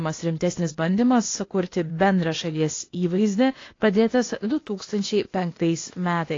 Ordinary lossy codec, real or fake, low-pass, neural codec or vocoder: AAC, 32 kbps; fake; 7.2 kHz; codec, 16 kHz, 0.8 kbps, ZipCodec